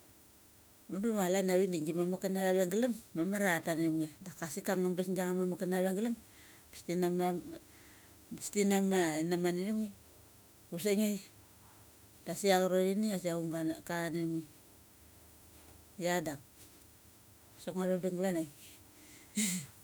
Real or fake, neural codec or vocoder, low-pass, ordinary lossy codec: fake; autoencoder, 48 kHz, 32 numbers a frame, DAC-VAE, trained on Japanese speech; none; none